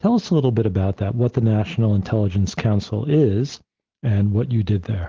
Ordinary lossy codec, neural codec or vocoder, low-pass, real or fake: Opus, 16 kbps; none; 7.2 kHz; real